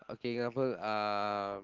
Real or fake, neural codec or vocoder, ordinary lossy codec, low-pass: real; none; Opus, 16 kbps; 7.2 kHz